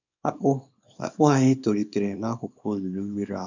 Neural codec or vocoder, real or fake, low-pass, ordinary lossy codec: codec, 24 kHz, 0.9 kbps, WavTokenizer, small release; fake; 7.2 kHz; none